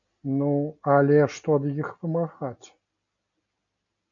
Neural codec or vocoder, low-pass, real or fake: none; 7.2 kHz; real